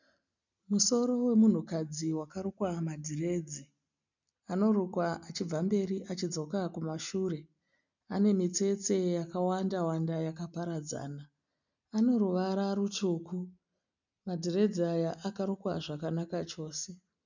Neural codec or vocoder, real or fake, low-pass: none; real; 7.2 kHz